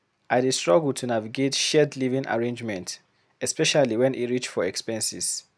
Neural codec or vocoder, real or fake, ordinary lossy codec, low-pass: none; real; none; none